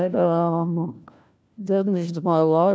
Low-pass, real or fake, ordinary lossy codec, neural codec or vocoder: none; fake; none; codec, 16 kHz, 1 kbps, FunCodec, trained on LibriTTS, 50 frames a second